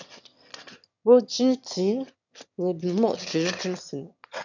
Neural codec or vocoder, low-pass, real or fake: autoencoder, 22.05 kHz, a latent of 192 numbers a frame, VITS, trained on one speaker; 7.2 kHz; fake